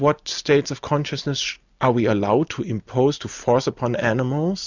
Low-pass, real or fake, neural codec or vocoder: 7.2 kHz; real; none